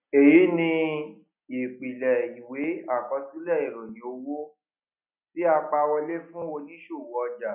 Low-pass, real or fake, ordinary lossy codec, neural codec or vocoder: 3.6 kHz; real; none; none